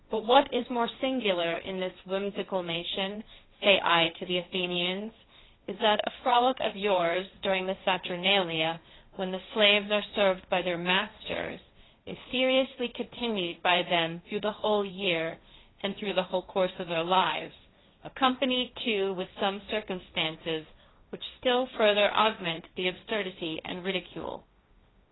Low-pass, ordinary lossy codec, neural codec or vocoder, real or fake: 7.2 kHz; AAC, 16 kbps; codec, 16 kHz, 1.1 kbps, Voila-Tokenizer; fake